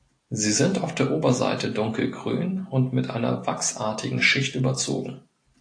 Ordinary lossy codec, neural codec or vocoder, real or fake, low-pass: AAC, 48 kbps; none; real; 9.9 kHz